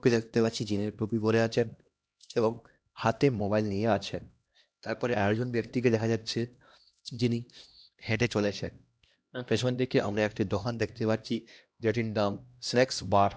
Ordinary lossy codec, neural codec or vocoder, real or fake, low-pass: none; codec, 16 kHz, 1 kbps, X-Codec, HuBERT features, trained on LibriSpeech; fake; none